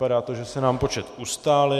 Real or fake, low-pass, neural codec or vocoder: real; 14.4 kHz; none